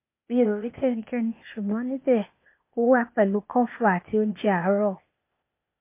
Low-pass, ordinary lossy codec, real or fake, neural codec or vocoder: 3.6 kHz; MP3, 24 kbps; fake; codec, 16 kHz, 0.8 kbps, ZipCodec